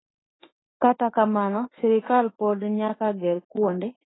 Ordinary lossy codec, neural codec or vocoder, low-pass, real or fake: AAC, 16 kbps; autoencoder, 48 kHz, 32 numbers a frame, DAC-VAE, trained on Japanese speech; 7.2 kHz; fake